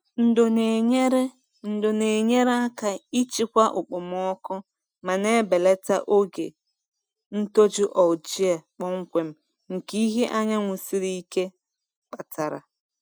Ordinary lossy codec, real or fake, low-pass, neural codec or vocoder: none; real; none; none